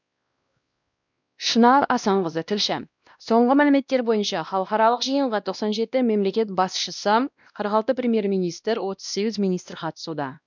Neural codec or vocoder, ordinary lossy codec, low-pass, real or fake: codec, 16 kHz, 1 kbps, X-Codec, WavLM features, trained on Multilingual LibriSpeech; none; 7.2 kHz; fake